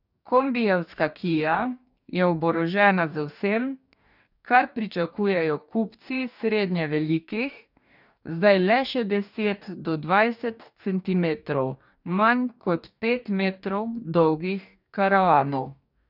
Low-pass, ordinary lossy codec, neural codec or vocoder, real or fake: 5.4 kHz; none; codec, 44.1 kHz, 2.6 kbps, DAC; fake